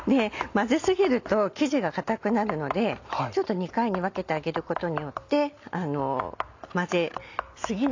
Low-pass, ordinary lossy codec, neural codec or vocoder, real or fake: 7.2 kHz; AAC, 48 kbps; none; real